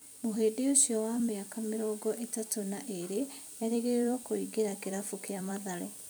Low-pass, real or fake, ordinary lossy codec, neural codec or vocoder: none; fake; none; vocoder, 44.1 kHz, 128 mel bands every 256 samples, BigVGAN v2